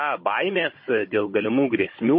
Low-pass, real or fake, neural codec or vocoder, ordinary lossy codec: 7.2 kHz; fake; codec, 16 kHz, 16 kbps, FunCodec, trained on Chinese and English, 50 frames a second; MP3, 24 kbps